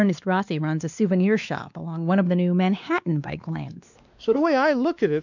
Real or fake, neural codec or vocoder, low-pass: fake; codec, 16 kHz, 2 kbps, X-Codec, WavLM features, trained on Multilingual LibriSpeech; 7.2 kHz